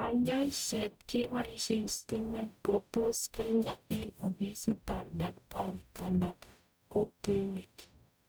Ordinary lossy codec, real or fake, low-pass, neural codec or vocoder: none; fake; none; codec, 44.1 kHz, 0.9 kbps, DAC